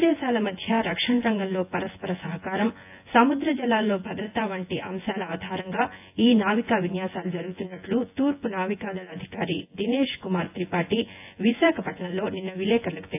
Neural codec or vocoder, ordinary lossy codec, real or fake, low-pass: vocoder, 24 kHz, 100 mel bands, Vocos; AAC, 32 kbps; fake; 3.6 kHz